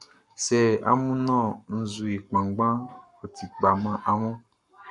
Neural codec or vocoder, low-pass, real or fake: codec, 44.1 kHz, 7.8 kbps, Pupu-Codec; 10.8 kHz; fake